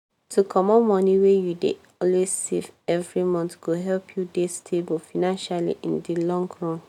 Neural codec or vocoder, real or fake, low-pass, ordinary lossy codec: none; real; 19.8 kHz; none